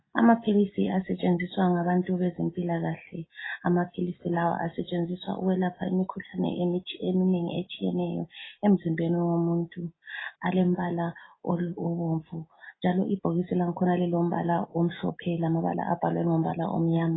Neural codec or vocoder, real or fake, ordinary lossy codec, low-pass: none; real; AAC, 16 kbps; 7.2 kHz